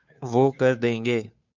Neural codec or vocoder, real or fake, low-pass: codec, 16 kHz, 2 kbps, FunCodec, trained on Chinese and English, 25 frames a second; fake; 7.2 kHz